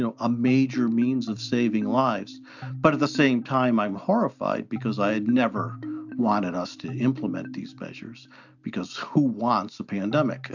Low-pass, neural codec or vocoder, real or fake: 7.2 kHz; none; real